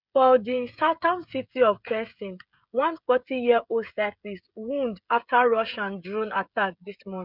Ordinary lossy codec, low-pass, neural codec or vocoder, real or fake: none; 5.4 kHz; codec, 16 kHz, 16 kbps, FreqCodec, smaller model; fake